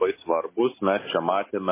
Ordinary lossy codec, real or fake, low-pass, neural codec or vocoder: MP3, 16 kbps; real; 3.6 kHz; none